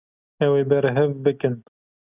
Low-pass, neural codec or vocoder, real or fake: 3.6 kHz; none; real